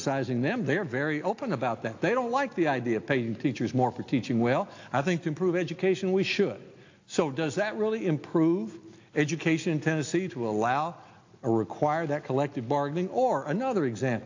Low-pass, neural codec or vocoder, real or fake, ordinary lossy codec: 7.2 kHz; none; real; AAC, 48 kbps